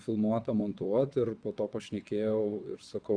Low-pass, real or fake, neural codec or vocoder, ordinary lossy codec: 9.9 kHz; fake; vocoder, 22.05 kHz, 80 mel bands, WaveNeXt; Opus, 64 kbps